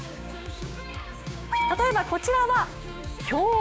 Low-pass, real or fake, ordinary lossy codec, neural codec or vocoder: none; fake; none; codec, 16 kHz, 6 kbps, DAC